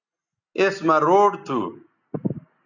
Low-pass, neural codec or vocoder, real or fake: 7.2 kHz; none; real